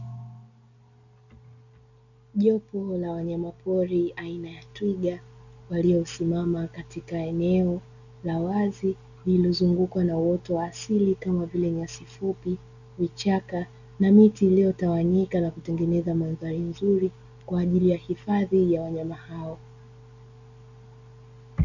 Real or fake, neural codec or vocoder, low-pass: real; none; 7.2 kHz